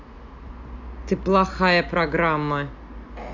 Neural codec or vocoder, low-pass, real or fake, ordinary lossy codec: none; 7.2 kHz; real; AAC, 48 kbps